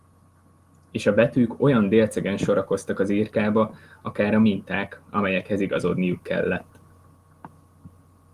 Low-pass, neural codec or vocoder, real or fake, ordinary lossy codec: 14.4 kHz; none; real; Opus, 24 kbps